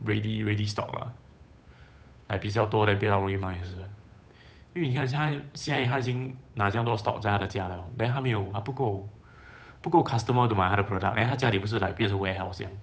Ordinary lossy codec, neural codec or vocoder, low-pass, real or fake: none; codec, 16 kHz, 8 kbps, FunCodec, trained on Chinese and English, 25 frames a second; none; fake